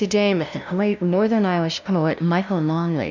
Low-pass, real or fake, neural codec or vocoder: 7.2 kHz; fake; codec, 16 kHz, 0.5 kbps, FunCodec, trained on LibriTTS, 25 frames a second